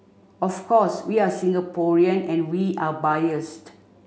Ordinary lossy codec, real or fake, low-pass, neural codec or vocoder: none; real; none; none